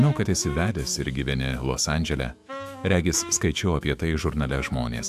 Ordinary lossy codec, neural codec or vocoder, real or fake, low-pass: AAC, 96 kbps; autoencoder, 48 kHz, 128 numbers a frame, DAC-VAE, trained on Japanese speech; fake; 14.4 kHz